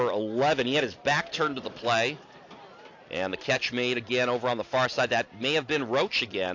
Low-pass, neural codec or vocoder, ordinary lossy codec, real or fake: 7.2 kHz; none; AAC, 48 kbps; real